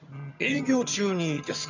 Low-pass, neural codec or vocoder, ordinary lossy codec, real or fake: 7.2 kHz; vocoder, 22.05 kHz, 80 mel bands, HiFi-GAN; none; fake